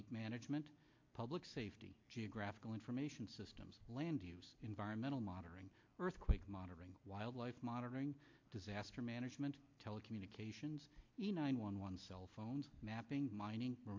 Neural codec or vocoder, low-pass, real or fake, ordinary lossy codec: none; 7.2 kHz; real; MP3, 48 kbps